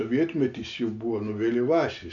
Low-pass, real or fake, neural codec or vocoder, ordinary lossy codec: 7.2 kHz; real; none; AAC, 64 kbps